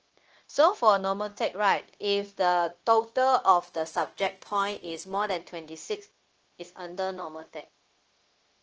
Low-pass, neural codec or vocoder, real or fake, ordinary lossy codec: 7.2 kHz; codec, 16 kHz, 0.9 kbps, LongCat-Audio-Codec; fake; Opus, 16 kbps